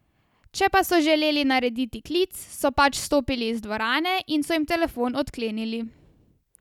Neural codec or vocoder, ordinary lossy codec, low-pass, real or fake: none; none; 19.8 kHz; real